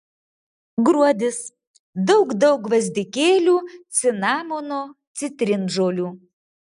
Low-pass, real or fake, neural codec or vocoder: 10.8 kHz; real; none